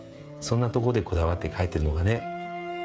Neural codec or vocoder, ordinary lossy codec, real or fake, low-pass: codec, 16 kHz, 16 kbps, FreqCodec, smaller model; none; fake; none